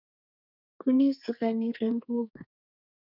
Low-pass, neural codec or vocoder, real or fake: 5.4 kHz; codec, 32 kHz, 1.9 kbps, SNAC; fake